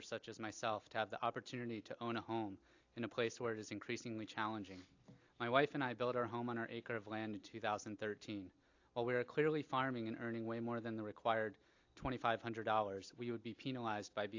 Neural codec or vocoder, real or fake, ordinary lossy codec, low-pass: none; real; AAC, 48 kbps; 7.2 kHz